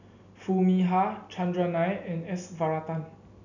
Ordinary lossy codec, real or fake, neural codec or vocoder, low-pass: none; real; none; 7.2 kHz